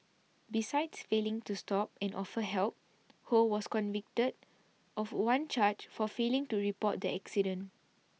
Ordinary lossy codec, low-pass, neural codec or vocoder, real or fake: none; none; none; real